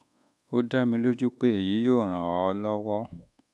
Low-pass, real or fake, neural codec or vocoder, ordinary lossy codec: none; fake; codec, 24 kHz, 1.2 kbps, DualCodec; none